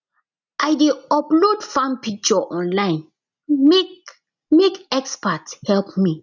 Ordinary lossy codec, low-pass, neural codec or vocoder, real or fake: none; 7.2 kHz; none; real